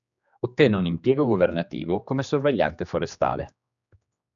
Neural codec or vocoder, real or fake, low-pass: codec, 16 kHz, 2 kbps, X-Codec, HuBERT features, trained on general audio; fake; 7.2 kHz